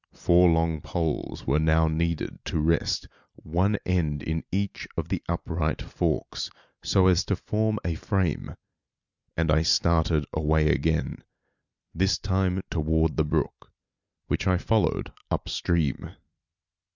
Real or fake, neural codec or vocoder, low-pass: real; none; 7.2 kHz